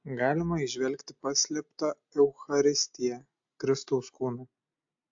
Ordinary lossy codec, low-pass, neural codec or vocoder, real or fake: AAC, 64 kbps; 7.2 kHz; none; real